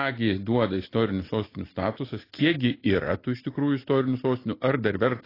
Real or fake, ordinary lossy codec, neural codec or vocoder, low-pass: real; AAC, 24 kbps; none; 5.4 kHz